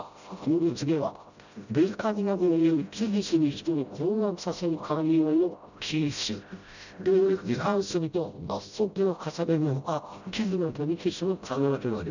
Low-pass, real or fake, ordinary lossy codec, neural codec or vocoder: 7.2 kHz; fake; none; codec, 16 kHz, 0.5 kbps, FreqCodec, smaller model